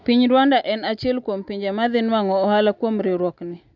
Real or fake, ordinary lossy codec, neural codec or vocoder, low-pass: real; none; none; 7.2 kHz